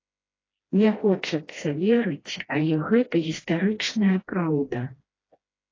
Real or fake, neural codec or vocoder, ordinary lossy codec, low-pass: fake; codec, 16 kHz, 1 kbps, FreqCodec, smaller model; AAC, 32 kbps; 7.2 kHz